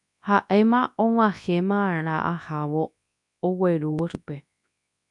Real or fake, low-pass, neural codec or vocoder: fake; 10.8 kHz; codec, 24 kHz, 0.9 kbps, WavTokenizer, large speech release